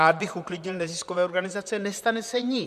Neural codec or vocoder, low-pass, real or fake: vocoder, 44.1 kHz, 128 mel bands, Pupu-Vocoder; 14.4 kHz; fake